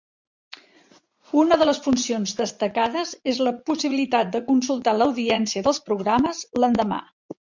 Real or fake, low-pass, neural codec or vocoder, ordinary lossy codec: fake; 7.2 kHz; vocoder, 44.1 kHz, 80 mel bands, Vocos; MP3, 64 kbps